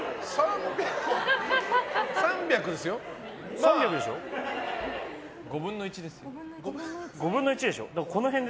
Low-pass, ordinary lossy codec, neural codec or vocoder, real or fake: none; none; none; real